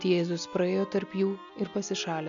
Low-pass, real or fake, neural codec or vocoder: 7.2 kHz; real; none